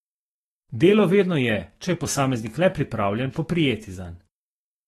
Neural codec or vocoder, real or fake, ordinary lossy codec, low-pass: autoencoder, 48 kHz, 128 numbers a frame, DAC-VAE, trained on Japanese speech; fake; AAC, 32 kbps; 19.8 kHz